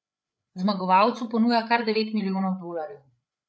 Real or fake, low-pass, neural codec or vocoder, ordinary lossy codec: fake; none; codec, 16 kHz, 8 kbps, FreqCodec, larger model; none